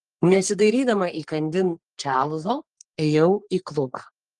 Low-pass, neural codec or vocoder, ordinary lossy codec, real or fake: 10.8 kHz; codec, 44.1 kHz, 3.4 kbps, Pupu-Codec; Opus, 24 kbps; fake